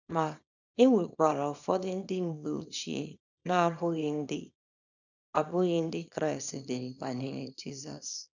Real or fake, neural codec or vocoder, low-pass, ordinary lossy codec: fake; codec, 24 kHz, 0.9 kbps, WavTokenizer, small release; 7.2 kHz; none